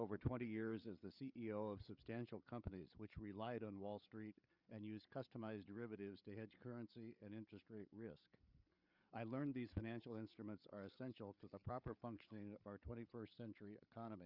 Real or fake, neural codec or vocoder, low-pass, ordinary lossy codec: fake; codec, 16 kHz, 8 kbps, FreqCodec, larger model; 5.4 kHz; Opus, 64 kbps